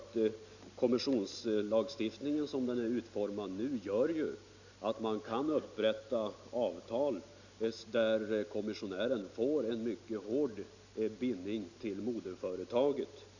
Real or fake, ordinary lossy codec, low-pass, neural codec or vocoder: real; none; 7.2 kHz; none